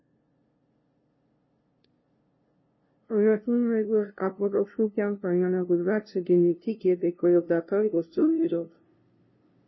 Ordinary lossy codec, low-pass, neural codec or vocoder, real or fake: MP3, 24 kbps; 7.2 kHz; codec, 16 kHz, 0.5 kbps, FunCodec, trained on LibriTTS, 25 frames a second; fake